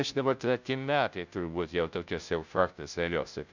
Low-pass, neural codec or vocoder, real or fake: 7.2 kHz; codec, 16 kHz, 0.5 kbps, FunCodec, trained on Chinese and English, 25 frames a second; fake